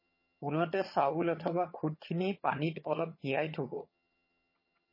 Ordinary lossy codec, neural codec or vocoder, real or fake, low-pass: MP3, 24 kbps; vocoder, 22.05 kHz, 80 mel bands, HiFi-GAN; fake; 5.4 kHz